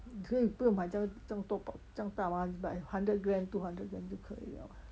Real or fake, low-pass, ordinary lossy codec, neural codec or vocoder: real; none; none; none